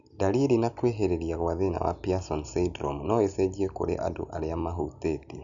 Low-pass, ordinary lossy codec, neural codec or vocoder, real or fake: 7.2 kHz; none; none; real